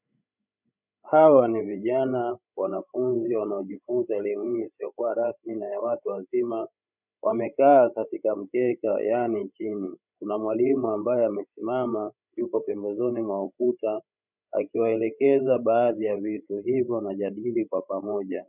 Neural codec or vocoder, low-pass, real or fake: codec, 16 kHz, 16 kbps, FreqCodec, larger model; 3.6 kHz; fake